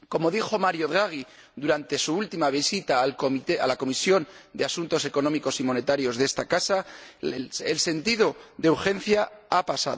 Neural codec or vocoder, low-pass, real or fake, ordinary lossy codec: none; none; real; none